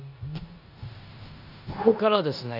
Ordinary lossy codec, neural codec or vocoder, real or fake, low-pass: none; codec, 16 kHz in and 24 kHz out, 0.9 kbps, LongCat-Audio-Codec, four codebook decoder; fake; 5.4 kHz